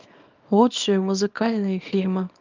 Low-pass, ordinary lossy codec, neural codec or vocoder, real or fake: 7.2 kHz; Opus, 24 kbps; codec, 24 kHz, 0.9 kbps, WavTokenizer, small release; fake